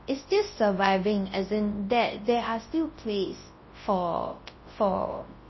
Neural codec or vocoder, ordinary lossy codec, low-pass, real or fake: codec, 24 kHz, 0.9 kbps, WavTokenizer, large speech release; MP3, 24 kbps; 7.2 kHz; fake